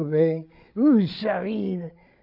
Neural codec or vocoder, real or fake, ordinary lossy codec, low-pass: codec, 16 kHz, 8 kbps, FreqCodec, larger model; fake; AAC, 48 kbps; 5.4 kHz